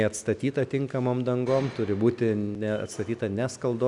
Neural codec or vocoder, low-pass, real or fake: none; 10.8 kHz; real